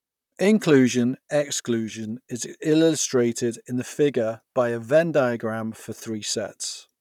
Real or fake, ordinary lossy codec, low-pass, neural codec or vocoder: fake; none; 19.8 kHz; vocoder, 44.1 kHz, 128 mel bands, Pupu-Vocoder